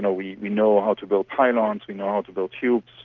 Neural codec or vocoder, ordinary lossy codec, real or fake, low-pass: none; Opus, 24 kbps; real; 7.2 kHz